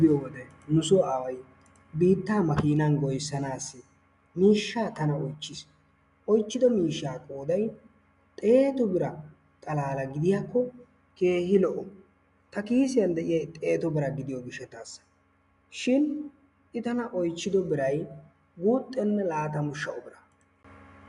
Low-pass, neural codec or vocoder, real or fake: 10.8 kHz; none; real